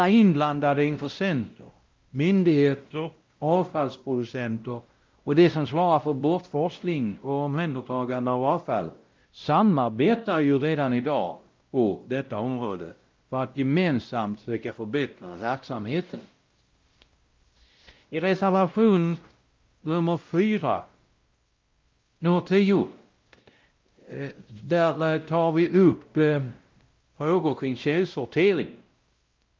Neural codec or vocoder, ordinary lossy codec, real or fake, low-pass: codec, 16 kHz, 0.5 kbps, X-Codec, WavLM features, trained on Multilingual LibriSpeech; Opus, 32 kbps; fake; 7.2 kHz